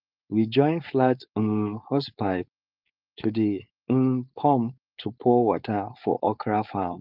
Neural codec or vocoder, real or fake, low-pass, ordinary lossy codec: codec, 16 kHz, 4.8 kbps, FACodec; fake; 5.4 kHz; Opus, 24 kbps